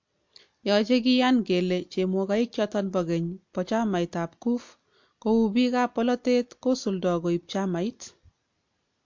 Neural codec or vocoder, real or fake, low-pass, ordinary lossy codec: none; real; 7.2 kHz; MP3, 48 kbps